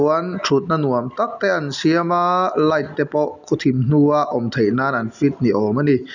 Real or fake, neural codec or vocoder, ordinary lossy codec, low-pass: real; none; none; 7.2 kHz